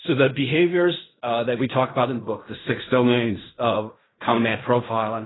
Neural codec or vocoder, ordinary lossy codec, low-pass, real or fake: codec, 16 kHz in and 24 kHz out, 0.4 kbps, LongCat-Audio-Codec, fine tuned four codebook decoder; AAC, 16 kbps; 7.2 kHz; fake